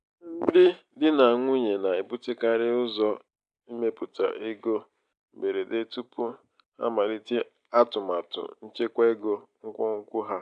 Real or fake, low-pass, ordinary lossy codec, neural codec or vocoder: real; 9.9 kHz; AAC, 96 kbps; none